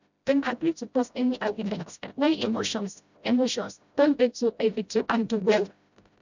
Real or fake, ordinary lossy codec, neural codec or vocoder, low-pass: fake; none; codec, 16 kHz, 0.5 kbps, FreqCodec, smaller model; 7.2 kHz